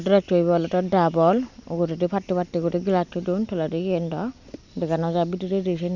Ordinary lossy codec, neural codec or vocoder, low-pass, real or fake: none; none; 7.2 kHz; real